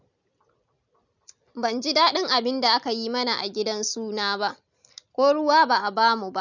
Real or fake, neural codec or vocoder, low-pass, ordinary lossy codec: real; none; 7.2 kHz; none